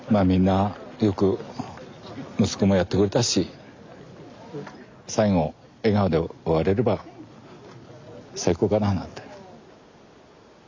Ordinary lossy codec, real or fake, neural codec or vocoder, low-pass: MP3, 64 kbps; real; none; 7.2 kHz